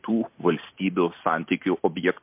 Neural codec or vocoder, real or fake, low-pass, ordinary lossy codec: none; real; 3.6 kHz; MP3, 32 kbps